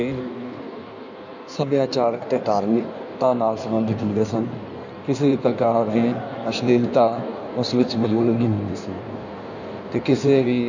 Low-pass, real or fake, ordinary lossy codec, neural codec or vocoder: 7.2 kHz; fake; none; codec, 16 kHz in and 24 kHz out, 1.1 kbps, FireRedTTS-2 codec